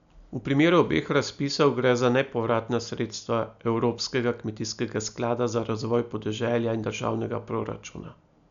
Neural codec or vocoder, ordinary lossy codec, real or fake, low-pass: none; none; real; 7.2 kHz